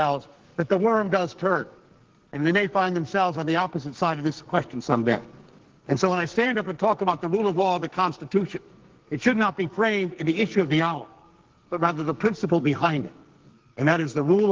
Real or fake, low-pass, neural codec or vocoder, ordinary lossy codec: fake; 7.2 kHz; codec, 44.1 kHz, 2.6 kbps, SNAC; Opus, 16 kbps